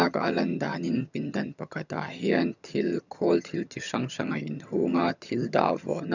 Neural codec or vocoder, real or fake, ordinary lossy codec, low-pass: vocoder, 22.05 kHz, 80 mel bands, HiFi-GAN; fake; none; 7.2 kHz